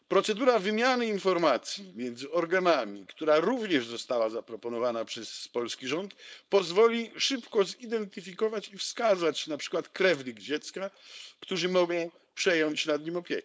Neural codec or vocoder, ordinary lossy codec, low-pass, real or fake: codec, 16 kHz, 4.8 kbps, FACodec; none; none; fake